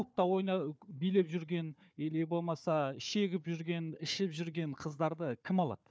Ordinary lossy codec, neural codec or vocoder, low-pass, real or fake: none; codec, 16 kHz, 4 kbps, FunCodec, trained on Chinese and English, 50 frames a second; none; fake